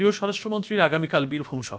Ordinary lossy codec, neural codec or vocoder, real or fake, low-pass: none; codec, 16 kHz, about 1 kbps, DyCAST, with the encoder's durations; fake; none